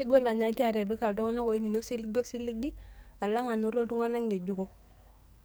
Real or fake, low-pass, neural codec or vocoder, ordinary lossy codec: fake; none; codec, 44.1 kHz, 2.6 kbps, SNAC; none